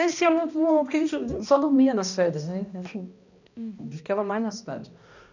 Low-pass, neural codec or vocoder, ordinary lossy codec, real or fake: 7.2 kHz; codec, 16 kHz, 1 kbps, X-Codec, HuBERT features, trained on balanced general audio; none; fake